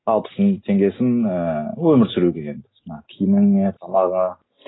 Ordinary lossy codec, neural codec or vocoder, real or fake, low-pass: AAC, 16 kbps; none; real; 7.2 kHz